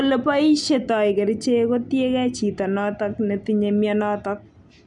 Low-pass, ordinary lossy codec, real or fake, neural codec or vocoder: 10.8 kHz; none; real; none